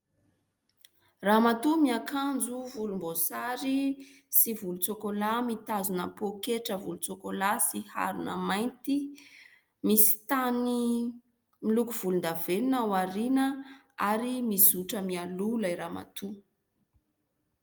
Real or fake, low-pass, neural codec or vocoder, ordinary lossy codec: real; 19.8 kHz; none; Opus, 32 kbps